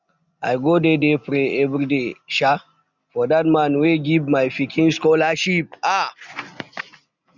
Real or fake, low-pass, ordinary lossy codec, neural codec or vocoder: real; 7.2 kHz; none; none